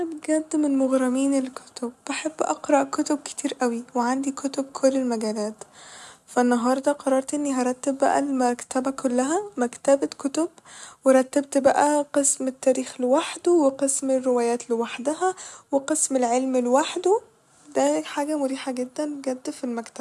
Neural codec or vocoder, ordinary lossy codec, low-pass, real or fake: none; none; none; real